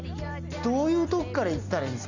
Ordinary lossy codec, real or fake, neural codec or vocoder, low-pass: Opus, 64 kbps; real; none; 7.2 kHz